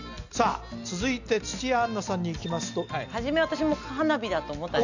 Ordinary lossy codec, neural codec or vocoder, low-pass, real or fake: none; none; 7.2 kHz; real